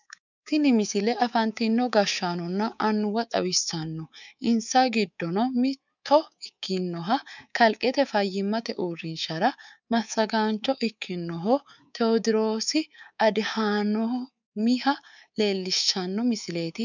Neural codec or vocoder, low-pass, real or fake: codec, 24 kHz, 3.1 kbps, DualCodec; 7.2 kHz; fake